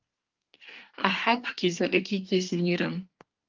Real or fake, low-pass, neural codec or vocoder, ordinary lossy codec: fake; 7.2 kHz; codec, 24 kHz, 1 kbps, SNAC; Opus, 32 kbps